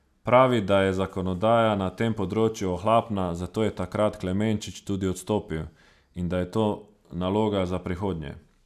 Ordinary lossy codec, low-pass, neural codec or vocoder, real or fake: none; 14.4 kHz; none; real